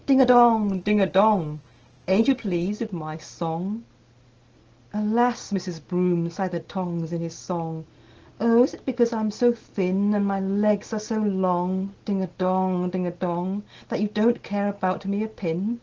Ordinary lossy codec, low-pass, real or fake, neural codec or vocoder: Opus, 16 kbps; 7.2 kHz; real; none